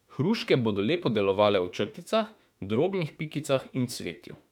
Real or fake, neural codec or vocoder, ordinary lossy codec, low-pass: fake; autoencoder, 48 kHz, 32 numbers a frame, DAC-VAE, trained on Japanese speech; none; 19.8 kHz